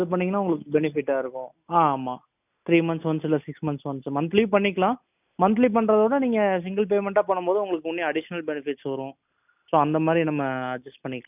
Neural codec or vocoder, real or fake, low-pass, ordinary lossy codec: none; real; 3.6 kHz; none